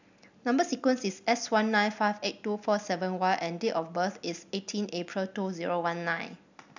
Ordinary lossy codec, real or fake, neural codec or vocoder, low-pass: none; real; none; 7.2 kHz